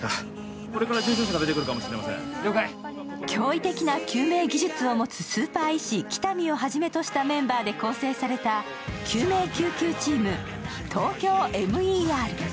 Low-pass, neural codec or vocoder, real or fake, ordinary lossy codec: none; none; real; none